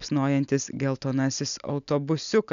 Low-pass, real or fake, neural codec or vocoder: 7.2 kHz; real; none